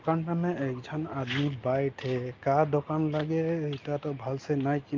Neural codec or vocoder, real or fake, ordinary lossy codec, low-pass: none; real; Opus, 24 kbps; 7.2 kHz